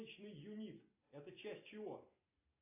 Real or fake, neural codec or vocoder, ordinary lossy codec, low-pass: real; none; AAC, 24 kbps; 3.6 kHz